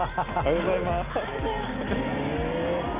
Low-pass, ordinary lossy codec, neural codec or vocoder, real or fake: 3.6 kHz; Opus, 64 kbps; none; real